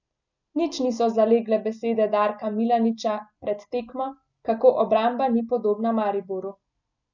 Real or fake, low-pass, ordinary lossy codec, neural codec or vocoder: real; 7.2 kHz; none; none